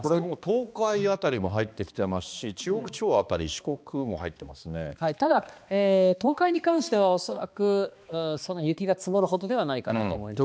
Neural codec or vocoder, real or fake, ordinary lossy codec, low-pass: codec, 16 kHz, 2 kbps, X-Codec, HuBERT features, trained on balanced general audio; fake; none; none